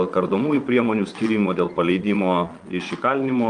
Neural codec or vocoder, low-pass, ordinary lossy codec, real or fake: vocoder, 22.05 kHz, 80 mel bands, Vocos; 9.9 kHz; Opus, 32 kbps; fake